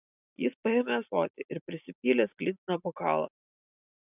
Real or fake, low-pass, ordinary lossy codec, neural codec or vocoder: real; 3.6 kHz; AAC, 32 kbps; none